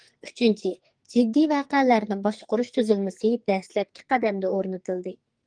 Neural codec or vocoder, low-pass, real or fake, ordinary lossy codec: codec, 44.1 kHz, 2.6 kbps, SNAC; 9.9 kHz; fake; Opus, 24 kbps